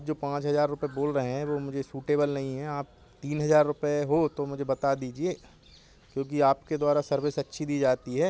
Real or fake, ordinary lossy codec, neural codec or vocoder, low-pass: real; none; none; none